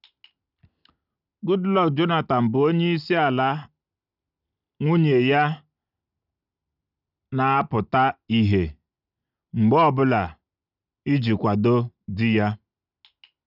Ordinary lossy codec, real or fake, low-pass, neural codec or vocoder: none; real; 5.4 kHz; none